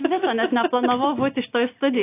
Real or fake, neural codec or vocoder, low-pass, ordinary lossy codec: real; none; 3.6 kHz; AAC, 24 kbps